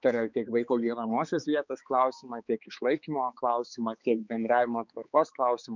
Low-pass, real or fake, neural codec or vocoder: 7.2 kHz; fake; codec, 16 kHz, 4 kbps, X-Codec, HuBERT features, trained on balanced general audio